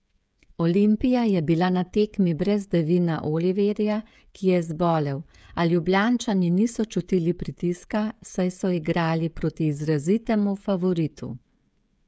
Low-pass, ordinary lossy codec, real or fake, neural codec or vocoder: none; none; fake; codec, 16 kHz, 16 kbps, FreqCodec, smaller model